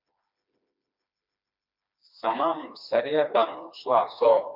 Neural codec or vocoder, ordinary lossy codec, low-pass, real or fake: codec, 16 kHz, 2 kbps, FreqCodec, smaller model; MP3, 48 kbps; 5.4 kHz; fake